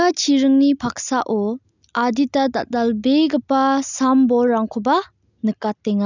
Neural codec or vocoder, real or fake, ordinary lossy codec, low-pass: none; real; none; 7.2 kHz